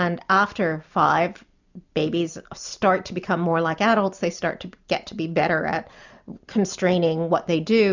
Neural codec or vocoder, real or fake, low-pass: none; real; 7.2 kHz